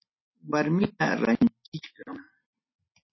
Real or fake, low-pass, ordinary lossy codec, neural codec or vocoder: real; 7.2 kHz; MP3, 24 kbps; none